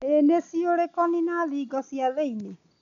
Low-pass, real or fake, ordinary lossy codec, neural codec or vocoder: 7.2 kHz; real; none; none